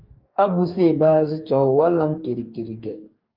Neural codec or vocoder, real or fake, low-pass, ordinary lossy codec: codec, 44.1 kHz, 2.6 kbps, DAC; fake; 5.4 kHz; Opus, 32 kbps